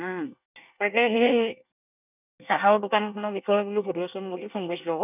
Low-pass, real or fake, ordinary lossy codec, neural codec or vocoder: 3.6 kHz; fake; none; codec, 24 kHz, 1 kbps, SNAC